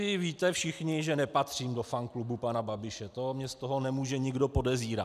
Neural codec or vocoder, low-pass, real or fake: none; 14.4 kHz; real